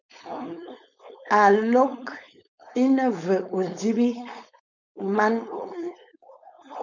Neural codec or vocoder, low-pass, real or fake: codec, 16 kHz, 4.8 kbps, FACodec; 7.2 kHz; fake